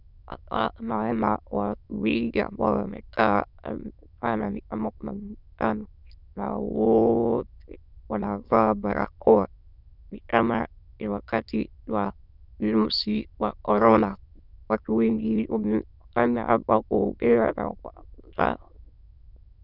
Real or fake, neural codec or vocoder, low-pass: fake; autoencoder, 22.05 kHz, a latent of 192 numbers a frame, VITS, trained on many speakers; 5.4 kHz